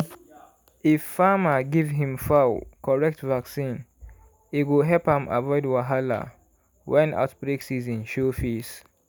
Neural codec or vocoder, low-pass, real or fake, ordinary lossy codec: none; none; real; none